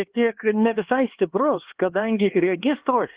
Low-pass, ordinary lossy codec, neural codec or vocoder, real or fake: 3.6 kHz; Opus, 16 kbps; codec, 16 kHz, 2 kbps, X-Codec, HuBERT features, trained on LibriSpeech; fake